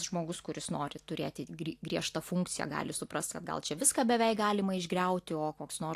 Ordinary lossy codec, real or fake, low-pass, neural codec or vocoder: AAC, 64 kbps; real; 14.4 kHz; none